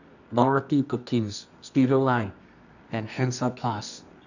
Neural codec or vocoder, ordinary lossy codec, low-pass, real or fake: codec, 24 kHz, 0.9 kbps, WavTokenizer, medium music audio release; none; 7.2 kHz; fake